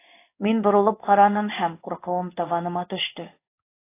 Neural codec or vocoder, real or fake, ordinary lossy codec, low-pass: codec, 16 kHz in and 24 kHz out, 1 kbps, XY-Tokenizer; fake; AAC, 24 kbps; 3.6 kHz